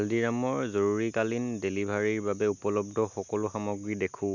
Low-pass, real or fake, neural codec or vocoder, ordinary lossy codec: 7.2 kHz; real; none; none